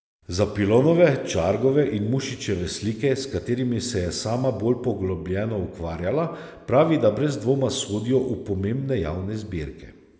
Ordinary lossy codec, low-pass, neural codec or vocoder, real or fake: none; none; none; real